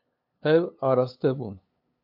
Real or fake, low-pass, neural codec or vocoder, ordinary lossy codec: fake; 5.4 kHz; codec, 16 kHz, 2 kbps, FunCodec, trained on LibriTTS, 25 frames a second; AAC, 48 kbps